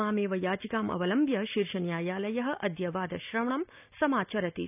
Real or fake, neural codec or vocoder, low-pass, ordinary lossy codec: real; none; 3.6 kHz; none